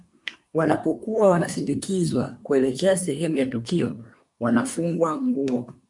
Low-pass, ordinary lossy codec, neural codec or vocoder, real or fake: 10.8 kHz; MP3, 64 kbps; codec, 24 kHz, 1 kbps, SNAC; fake